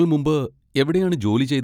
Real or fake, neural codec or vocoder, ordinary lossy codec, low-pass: real; none; none; 19.8 kHz